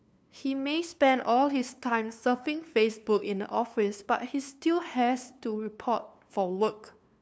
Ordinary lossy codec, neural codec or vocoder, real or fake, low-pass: none; codec, 16 kHz, 2 kbps, FunCodec, trained on LibriTTS, 25 frames a second; fake; none